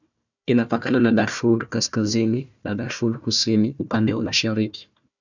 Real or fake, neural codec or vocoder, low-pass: fake; codec, 16 kHz, 1 kbps, FunCodec, trained on Chinese and English, 50 frames a second; 7.2 kHz